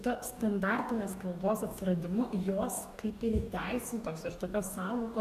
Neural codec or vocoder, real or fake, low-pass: codec, 44.1 kHz, 2.6 kbps, DAC; fake; 14.4 kHz